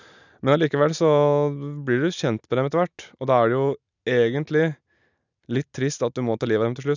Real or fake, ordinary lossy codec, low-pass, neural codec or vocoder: real; none; 7.2 kHz; none